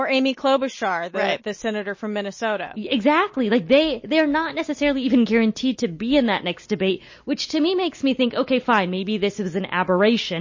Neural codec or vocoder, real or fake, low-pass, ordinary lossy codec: none; real; 7.2 kHz; MP3, 32 kbps